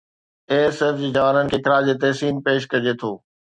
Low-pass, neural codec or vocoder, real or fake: 9.9 kHz; none; real